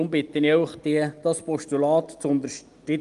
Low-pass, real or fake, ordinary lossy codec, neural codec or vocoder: 10.8 kHz; real; Opus, 32 kbps; none